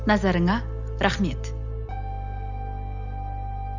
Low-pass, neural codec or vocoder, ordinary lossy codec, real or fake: 7.2 kHz; none; none; real